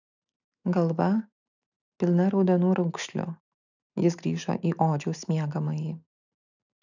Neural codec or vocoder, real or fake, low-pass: none; real; 7.2 kHz